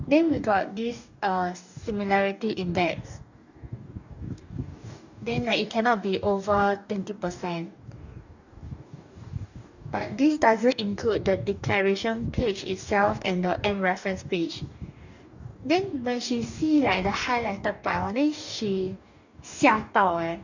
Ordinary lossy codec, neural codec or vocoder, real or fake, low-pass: none; codec, 44.1 kHz, 2.6 kbps, DAC; fake; 7.2 kHz